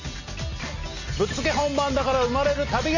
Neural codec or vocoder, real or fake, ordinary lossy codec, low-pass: none; real; none; 7.2 kHz